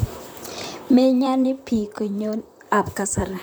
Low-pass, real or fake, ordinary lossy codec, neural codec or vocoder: none; fake; none; vocoder, 44.1 kHz, 128 mel bands, Pupu-Vocoder